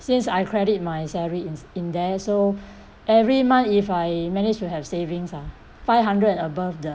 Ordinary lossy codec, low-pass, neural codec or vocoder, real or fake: none; none; none; real